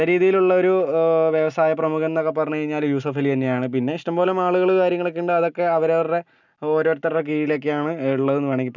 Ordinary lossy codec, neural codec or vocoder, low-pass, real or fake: none; none; 7.2 kHz; real